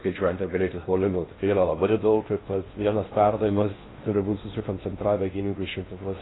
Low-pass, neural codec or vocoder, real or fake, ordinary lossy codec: 7.2 kHz; codec, 16 kHz in and 24 kHz out, 0.6 kbps, FocalCodec, streaming, 4096 codes; fake; AAC, 16 kbps